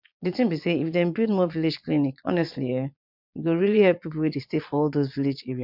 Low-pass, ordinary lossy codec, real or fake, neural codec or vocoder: 5.4 kHz; MP3, 48 kbps; fake; vocoder, 22.05 kHz, 80 mel bands, Vocos